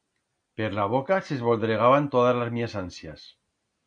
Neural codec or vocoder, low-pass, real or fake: none; 9.9 kHz; real